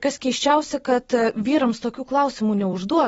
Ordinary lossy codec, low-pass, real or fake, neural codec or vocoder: AAC, 24 kbps; 19.8 kHz; fake; vocoder, 44.1 kHz, 128 mel bands every 256 samples, BigVGAN v2